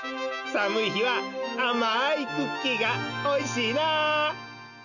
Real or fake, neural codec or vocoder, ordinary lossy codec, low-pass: real; none; none; 7.2 kHz